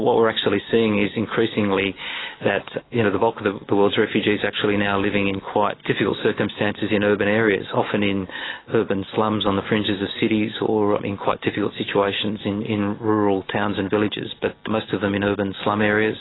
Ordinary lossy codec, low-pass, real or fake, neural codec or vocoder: AAC, 16 kbps; 7.2 kHz; real; none